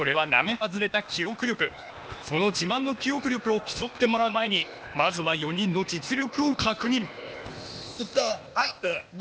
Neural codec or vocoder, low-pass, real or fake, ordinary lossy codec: codec, 16 kHz, 0.8 kbps, ZipCodec; none; fake; none